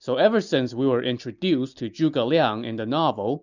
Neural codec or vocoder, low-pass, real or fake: none; 7.2 kHz; real